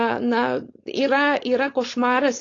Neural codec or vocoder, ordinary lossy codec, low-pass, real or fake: codec, 16 kHz, 4.8 kbps, FACodec; AAC, 32 kbps; 7.2 kHz; fake